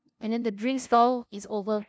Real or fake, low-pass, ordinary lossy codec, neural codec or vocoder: fake; none; none; codec, 16 kHz, 0.5 kbps, FunCodec, trained on LibriTTS, 25 frames a second